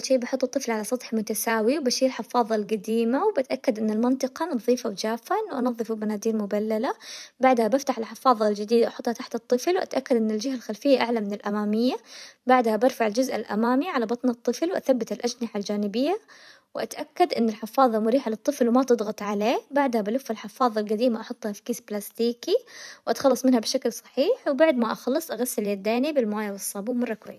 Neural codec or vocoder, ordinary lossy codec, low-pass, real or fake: vocoder, 44.1 kHz, 128 mel bands every 512 samples, BigVGAN v2; none; 14.4 kHz; fake